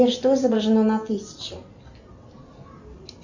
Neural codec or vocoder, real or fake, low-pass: none; real; 7.2 kHz